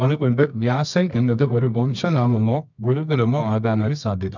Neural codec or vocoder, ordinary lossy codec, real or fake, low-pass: codec, 24 kHz, 0.9 kbps, WavTokenizer, medium music audio release; none; fake; 7.2 kHz